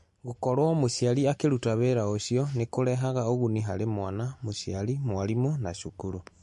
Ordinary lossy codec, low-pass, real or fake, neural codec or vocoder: MP3, 48 kbps; 14.4 kHz; real; none